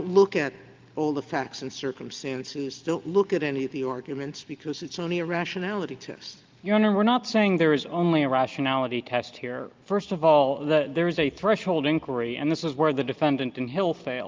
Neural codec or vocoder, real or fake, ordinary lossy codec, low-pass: none; real; Opus, 24 kbps; 7.2 kHz